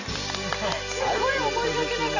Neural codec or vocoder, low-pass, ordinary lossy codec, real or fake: none; 7.2 kHz; none; real